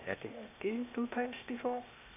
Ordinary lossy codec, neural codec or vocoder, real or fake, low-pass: none; codec, 16 kHz, 0.8 kbps, ZipCodec; fake; 3.6 kHz